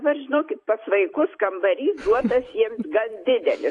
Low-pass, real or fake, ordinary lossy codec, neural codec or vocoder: 10.8 kHz; fake; AAC, 48 kbps; vocoder, 44.1 kHz, 128 mel bands every 256 samples, BigVGAN v2